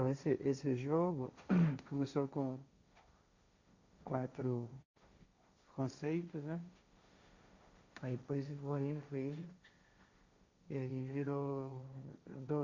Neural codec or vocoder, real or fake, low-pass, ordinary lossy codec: codec, 16 kHz, 1.1 kbps, Voila-Tokenizer; fake; 7.2 kHz; Opus, 64 kbps